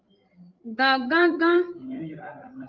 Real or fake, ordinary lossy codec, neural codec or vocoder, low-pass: fake; Opus, 24 kbps; codec, 16 kHz, 16 kbps, FreqCodec, larger model; 7.2 kHz